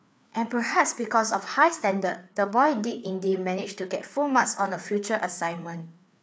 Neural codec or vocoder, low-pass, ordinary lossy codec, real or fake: codec, 16 kHz, 4 kbps, FreqCodec, larger model; none; none; fake